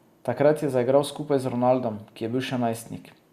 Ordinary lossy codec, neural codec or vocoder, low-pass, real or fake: Opus, 64 kbps; none; 14.4 kHz; real